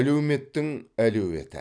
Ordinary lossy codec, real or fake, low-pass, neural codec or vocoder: none; real; 9.9 kHz; none